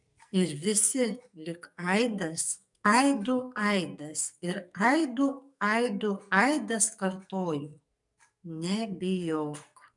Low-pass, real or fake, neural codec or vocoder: 10.8 kHz; fake; codec, 44.1 kHz, 2.6 kbps, SNAC